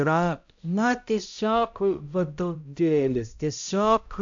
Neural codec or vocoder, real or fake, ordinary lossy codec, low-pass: codec, 16 kHz, 0.5 kbps, X-Codec, HuBERT features, trained on balanced general audio; fake; AAC, 64 kbps; 7.2 kHz